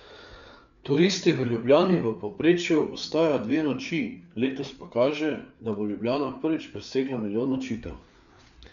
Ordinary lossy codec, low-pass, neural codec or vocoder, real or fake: none; 7.2 kHz; codec, 16 kHz, 4 kbps, FreqCodec, larger model; fake